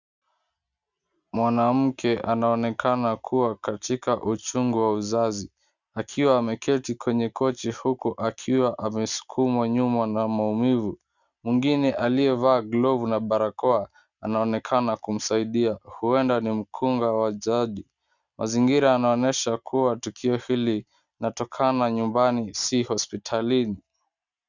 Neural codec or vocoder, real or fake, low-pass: none; real; 7.2 kHz